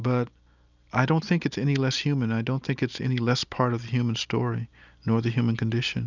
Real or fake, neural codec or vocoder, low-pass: real; none; 7.2 kHz